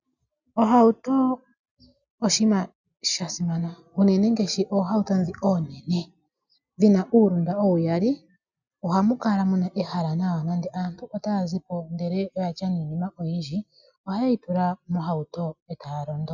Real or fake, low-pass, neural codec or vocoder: real; 7.2 kHz; none